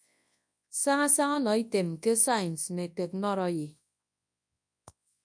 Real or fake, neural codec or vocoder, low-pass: fake; codec, 24 kHz, 0.9 kbps, WavTokenizer, large speech release; 9.9 kHz